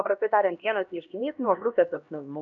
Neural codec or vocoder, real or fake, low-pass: codec, 16 kHz, 1 kbps, X-Codec, HuBERT features, trained on LibriSpeech; fake; 7.2 kHz